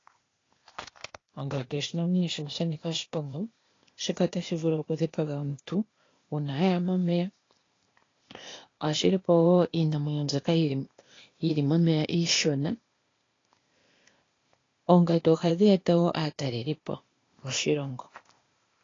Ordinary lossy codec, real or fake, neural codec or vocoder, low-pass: AAC, 32 kbps; fake; codec, 16 kHz, 0.8 kbps, ZipCodec; 7.2 kHz